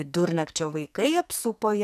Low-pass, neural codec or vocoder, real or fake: 14.4 kHz; codec, 44.1 kHz, 2.6 kbps, SNAC; fake